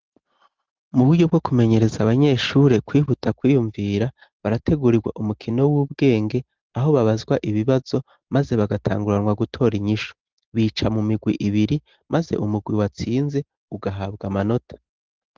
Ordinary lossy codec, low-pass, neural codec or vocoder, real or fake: Opus, 16 kbps; 7.2 kHz; none; real